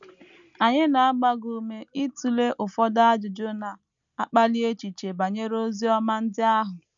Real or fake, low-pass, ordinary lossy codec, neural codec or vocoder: real; 7.2 kHz; none; none